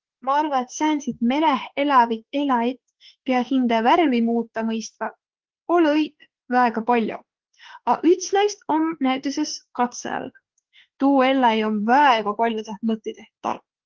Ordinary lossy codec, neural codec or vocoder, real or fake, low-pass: Opus, 16 kbps; autoencoder, 48 kHz, 32 numbers a frame, DAC-VAE, trained on Japanese speech; fake; 7.2 kHz